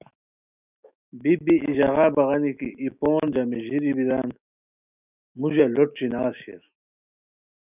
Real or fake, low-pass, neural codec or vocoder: fake; 3.6 kHz; autoencoder, 48 kHz, 128 numbers a frame, DAC-VAE, trained on Japanese speech